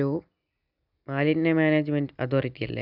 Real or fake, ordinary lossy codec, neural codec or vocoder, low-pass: real; none; none; 5.4 kHz